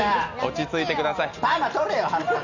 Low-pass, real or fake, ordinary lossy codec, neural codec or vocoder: 7.2 kHz; real; none; none